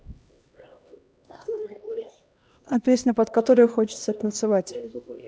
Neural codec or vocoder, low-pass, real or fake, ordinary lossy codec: codec, 16 kHz, 1 kbps, X-Codec, HuBERT features, trained on LibriSpeech; none; fake; none